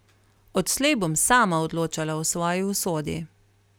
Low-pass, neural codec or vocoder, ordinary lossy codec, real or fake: none; none; none; real